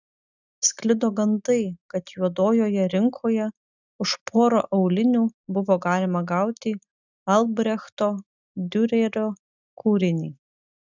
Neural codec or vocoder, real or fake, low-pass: none; real; 7.2 kHz